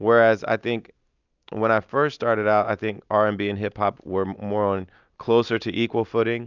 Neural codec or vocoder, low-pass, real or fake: none; 7.2 kHz; real